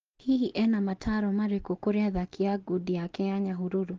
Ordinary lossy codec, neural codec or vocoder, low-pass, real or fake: Opus, 16 kbps; none; 7.2 kHz; real